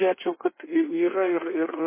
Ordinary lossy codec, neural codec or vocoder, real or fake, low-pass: MP3, 16 kbps; codec, 16 kHz in and 24 kHz out, 1.1 kbps, FireRedTTS-2 codec; fake; 3.6 kHz